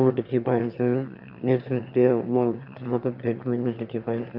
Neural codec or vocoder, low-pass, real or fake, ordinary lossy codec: autoencoder, 22.05 kHz, a latent of 192 numbers a frame, VITS, trained on one speaker; 5.4 kHz; fake; none